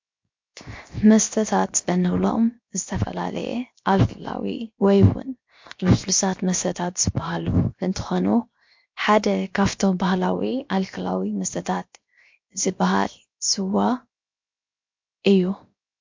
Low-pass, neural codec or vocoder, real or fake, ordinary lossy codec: 7.2 kHz; codec, 16 kHz, 0.7 kbps, FocalCodec; fake; MP3, 48 kbps